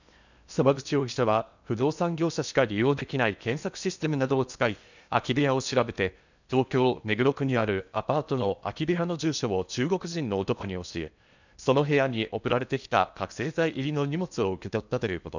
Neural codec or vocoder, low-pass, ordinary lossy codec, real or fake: codec, 16 kHz in and 24 kHz out, 0.8 kbps, FocalCodec, streaming, 65536 codes; 7.2 kHz; none; fake